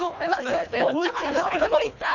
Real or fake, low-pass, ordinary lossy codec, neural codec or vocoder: fake; 7.2 kHz; none; codec, 24 kHz, 1.5 kbps, HILCodec